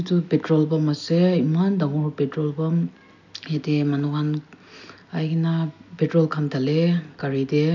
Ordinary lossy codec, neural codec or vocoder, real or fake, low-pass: none; none; real; 7.2 kHz